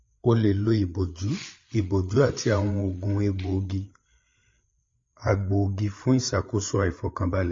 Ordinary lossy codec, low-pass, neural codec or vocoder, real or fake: MP3, 32 kbps; 7.2 kHz; codec, 16 kHz, 8 kbps, FreqCodec, larger model; fake